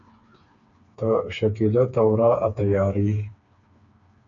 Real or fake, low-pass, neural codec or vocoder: fake; 7.2 kHz; codec, 16 kHz, 4 kbps, FreqCodec, smaller model